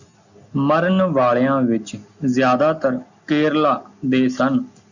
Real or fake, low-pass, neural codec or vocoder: real; 7.2 kHz; none